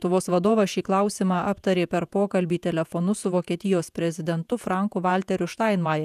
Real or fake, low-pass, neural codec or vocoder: fake; 14.4 kHz; vocoder, 48 kHz, 128 mel bands, Vocos